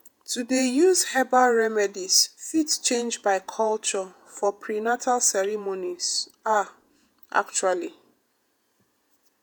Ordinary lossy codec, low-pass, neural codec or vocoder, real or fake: none; none; vocoder, 48 kHz, 128 mel bands, Vocos; fake